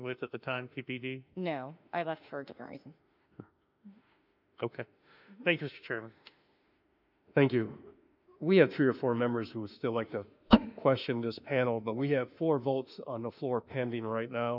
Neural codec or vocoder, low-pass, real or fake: autoencoder, 48 kHz, 32 numbers a frame, DAC-VAE, trained on Japanese speech; 5.4 kHz; fake